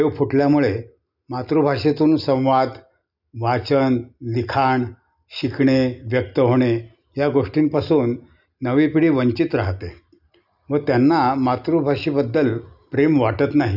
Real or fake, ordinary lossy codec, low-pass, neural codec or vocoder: real; none; 5.4 kHz; none